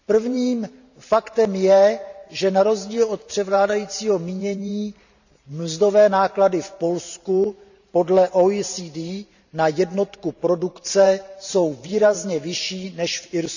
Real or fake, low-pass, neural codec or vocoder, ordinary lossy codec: fake; 7.2 kHz; vocoder, 44.1 kHz, 128 mel bands every 512 samples, BigVGAN v2; none